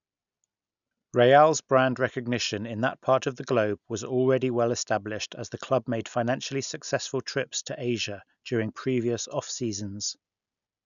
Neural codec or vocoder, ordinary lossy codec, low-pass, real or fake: none; none; 7.2 kHz; real